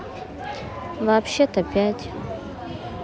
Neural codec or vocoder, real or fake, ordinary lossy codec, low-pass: none; real; none; none